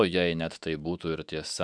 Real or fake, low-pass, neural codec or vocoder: fake; 9.9 kHz; autoencoder, 48 kHz, 128 numbers a frame, DAC-VAE, trained on Japanese speech